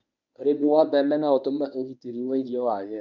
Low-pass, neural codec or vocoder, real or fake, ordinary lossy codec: 7.2 kHz; codec, 24 kHz, 0.9 kbps, WavTokenizer, medium speech release version 1; fake; none